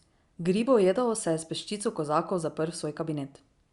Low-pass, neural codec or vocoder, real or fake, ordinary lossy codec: 10.8 kHz; none; real; Opus, 64 kbps